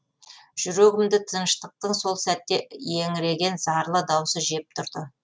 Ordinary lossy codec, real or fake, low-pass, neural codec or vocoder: none; real; none; none